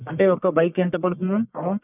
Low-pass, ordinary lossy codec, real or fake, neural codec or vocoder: 3.6 kHz; none; fake; codec, 44.1 kHz, 1.7 kbps, Pupu-Codec